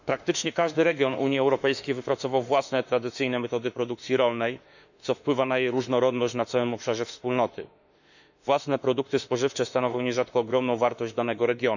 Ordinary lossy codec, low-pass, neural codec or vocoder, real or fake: none; 7.2 kHz; autoencoder, 48 kHz, 32 numbers a frame, DAC-VAE, trained on Japanese speech; fake